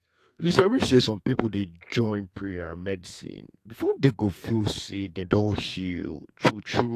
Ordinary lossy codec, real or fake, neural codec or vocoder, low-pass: AAC, 64 kbps; fake; codec, 32 kHz, 1.9 kbps, SNAC; 14.4 kHz